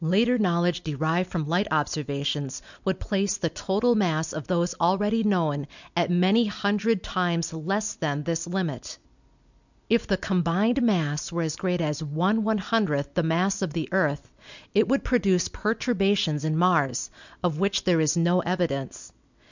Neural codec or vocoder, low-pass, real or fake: none; 7.2 kHz; real